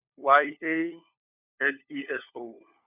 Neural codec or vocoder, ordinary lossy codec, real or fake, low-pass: codec, 16 kHz, 4 kbps, FunCodec, trained on LibriTTS, 50 frames a second; none; fake; 3.6 kHz